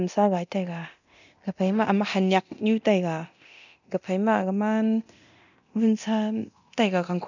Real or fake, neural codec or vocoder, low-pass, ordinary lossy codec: fake; codec, 24 kHz, 0.9 kbps, DualCodec; 7.2 kHz; none